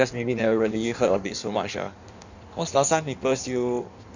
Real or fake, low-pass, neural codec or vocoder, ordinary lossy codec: fake; 7.2 kHz; codec, 16 kHz in and 24 kHz out, 1.1 kbps, FireRedTTS-2 codec; none